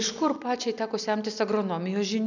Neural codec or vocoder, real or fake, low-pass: none; real; 7.2 kHz